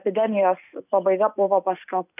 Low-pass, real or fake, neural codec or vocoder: 3.6 kHz; real; none